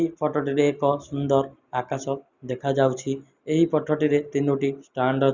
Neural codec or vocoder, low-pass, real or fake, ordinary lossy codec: none; 7.2 kHz; real; Opus, 64 kbps